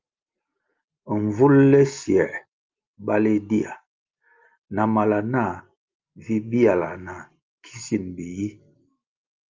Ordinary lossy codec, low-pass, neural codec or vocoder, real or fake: Opus, 24 kbps; 7.2 kHz; none; real